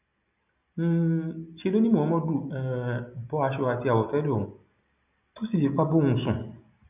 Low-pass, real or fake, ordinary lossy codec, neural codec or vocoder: 3.6 kHz; real; none; none